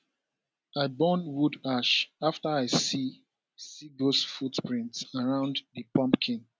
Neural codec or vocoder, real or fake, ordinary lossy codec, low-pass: none; real; none; none